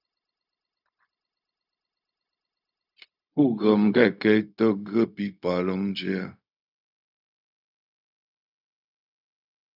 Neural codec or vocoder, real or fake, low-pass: codec, 16 kHz, 0.4 kbps, LongCat-Audio-Codec; fake; 5.4 kHz